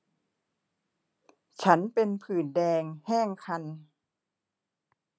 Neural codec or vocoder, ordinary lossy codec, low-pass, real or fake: none; none; none; real